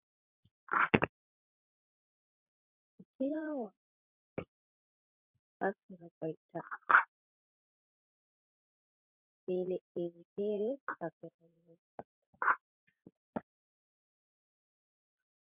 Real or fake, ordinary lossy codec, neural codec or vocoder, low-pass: fake; Opus, 64 kbps; vocoder, 44.1 kHz, 80 mel bands, Vocos; 3.6 kHz